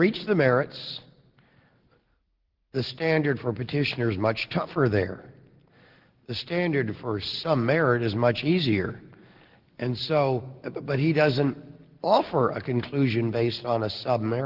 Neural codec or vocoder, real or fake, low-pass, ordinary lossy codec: none; real; 5.4 kHz; Opus, 16 kbps